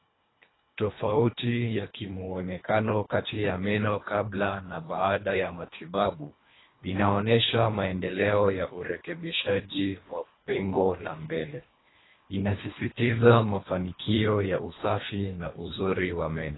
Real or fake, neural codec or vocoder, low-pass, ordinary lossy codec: fake; codec, 24 kHz, 1.5 kbps, HILCodec; 7.2 kHz; AAC, 16 kbps